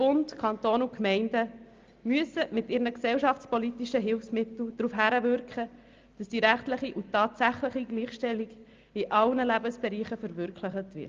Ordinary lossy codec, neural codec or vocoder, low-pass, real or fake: Opus, 24 kbps; none; 7.2 kHz; real